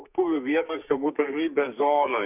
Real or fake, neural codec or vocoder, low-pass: fake; codec, 44.1 kHz, 2.6 kbps, SNAC; 3.6 kHz